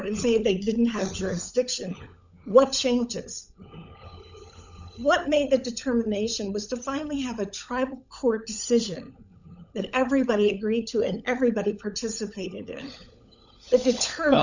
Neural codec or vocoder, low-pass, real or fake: codec, 16 kHz, 16 kbps, FunCodec, trained on LibriTTS, 50 frames a second; 7.2 kHz; fake